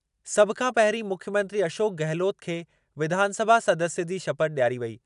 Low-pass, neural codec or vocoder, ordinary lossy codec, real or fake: 10.8 kHz; none; none; real